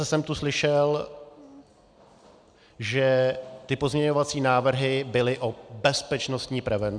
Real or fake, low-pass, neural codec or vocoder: real; 9.9 kHz; none